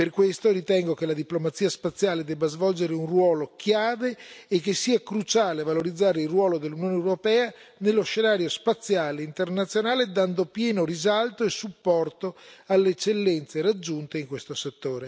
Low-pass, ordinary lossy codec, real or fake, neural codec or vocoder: none; none; real; none